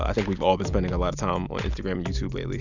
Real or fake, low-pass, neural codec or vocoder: real; 7.2 kHz; none